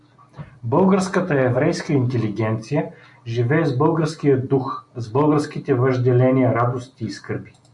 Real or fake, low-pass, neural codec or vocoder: real; 10.8 kHz; none